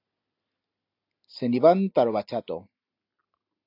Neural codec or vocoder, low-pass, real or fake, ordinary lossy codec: none; 5.4 kHz; real; AAC, 32 kbps